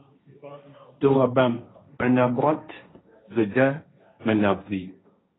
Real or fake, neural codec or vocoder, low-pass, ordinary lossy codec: fake; codec, 16 kHz, 1.1 kbps, Voila-Tokenizer; 7.2 kHz; AAC, 16 kbps